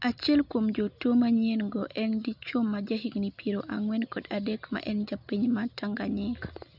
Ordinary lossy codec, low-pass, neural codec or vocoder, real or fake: none; 5.4 kHz; none; real